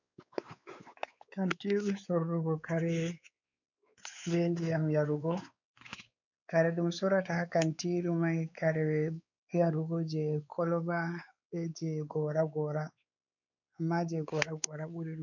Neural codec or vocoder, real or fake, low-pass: codec, 16 kHz, 4 kbps, X-Codec, WavLM features, trained on Multilingual LibriSpeech; fake; 7.2 kHz